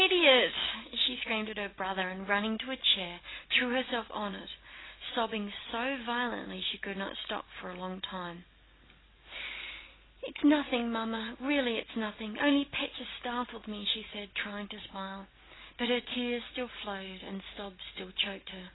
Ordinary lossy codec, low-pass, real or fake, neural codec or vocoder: AAC, 16 kbps; 7.2 kHz; real; none